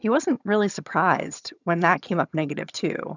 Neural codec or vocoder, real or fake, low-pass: vocoder, 22.05 kHz, 80 mel bands, HiFi-GAN; fake; 7.2 kHz